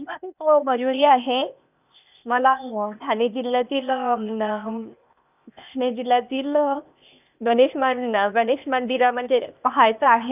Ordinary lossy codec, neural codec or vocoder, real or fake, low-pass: none; codec, 16 kHz, 0.8 kbps, ZipCodec; fake; 3.6 kHz